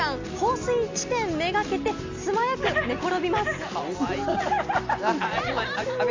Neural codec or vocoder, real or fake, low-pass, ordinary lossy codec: none; real; 7.2 kHz; MP3, 64 kbps